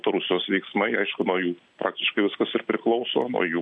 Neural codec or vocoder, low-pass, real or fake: none; 9.9 kHz; real